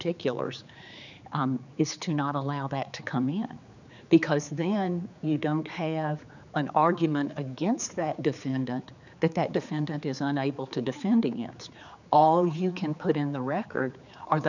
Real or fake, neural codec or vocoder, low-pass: fake; codec, 16 kHz, 4 kbps, X-Codec, HuBERT features, trained on general audio; 7.2 kHz